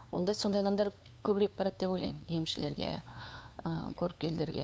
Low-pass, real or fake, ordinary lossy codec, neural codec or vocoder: none; fake; none; codec, 16 kHz, 2 kbps, FunCodec, trained on LibriTTS, 25 frames a second